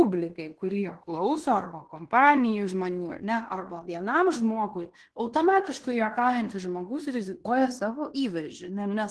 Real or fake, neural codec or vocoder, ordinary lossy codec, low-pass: fake; codec, 16 kHz in and 24 kHz out, 0.9 kbps, LongCat-Audio-Codec, fine tuned four codebook decoder; Opus, 16 kbps; 10.8 kHz